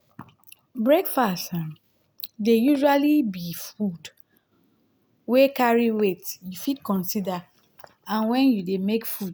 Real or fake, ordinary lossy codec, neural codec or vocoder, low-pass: real; none; none; none